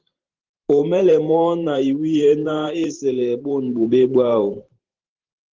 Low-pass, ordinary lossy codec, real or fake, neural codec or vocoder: 7.2 kHz; Opus, 16 kbps; fake; vocoder, 44.1 kHz, 128 mel bands every 512 samples, BigVGAN v2